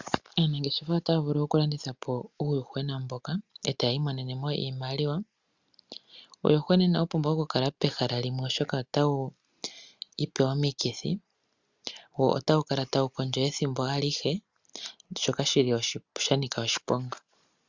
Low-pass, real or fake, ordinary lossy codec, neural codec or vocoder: 7.2 kHz; real; Opus, 64 kbps; none